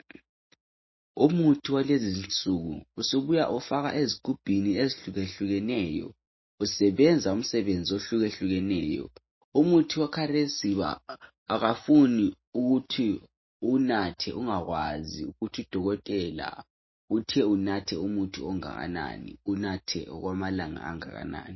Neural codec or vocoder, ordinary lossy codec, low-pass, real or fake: none; MP3, 24 kbps; 7.2 kHz; real